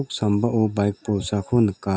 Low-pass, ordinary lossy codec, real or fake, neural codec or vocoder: none; none; real; none